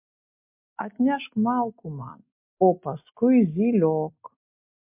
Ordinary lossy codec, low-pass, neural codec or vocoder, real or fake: MP3, 32 kbps; 3.6 kHz; none; real